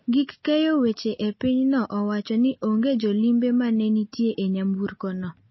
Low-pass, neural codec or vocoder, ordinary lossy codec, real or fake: 7.2 kHz; none; MP3, 24 kbps; real